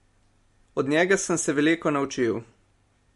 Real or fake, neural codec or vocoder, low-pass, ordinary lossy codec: real; none; 14.4 kHz; MP3, 48 kbps